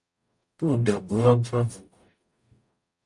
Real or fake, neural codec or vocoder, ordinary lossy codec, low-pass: fake; codec, 44.1 kHz, 0.9 kbps, DAC; MP3, 64 kbps; 10.8 kHz